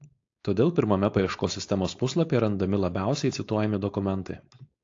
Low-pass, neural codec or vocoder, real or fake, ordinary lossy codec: 7.2 kHz; codec, 16 kHz, 4.8 kbps, FACodec; fake; AAC, 48 kbps